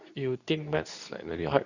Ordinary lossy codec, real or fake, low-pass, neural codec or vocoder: none; fake; 7.2 kHz; codec, 24 kHz, 0.9 kbps, WavTokenizer, medium speech release version 2